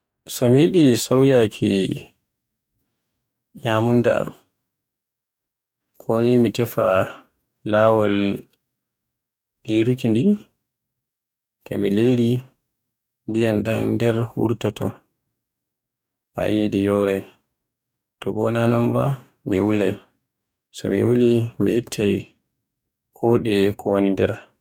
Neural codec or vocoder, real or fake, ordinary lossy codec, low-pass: codec, 44.1 kHz, 2.6 kbps, DAC; fake; none; 19.8 kHz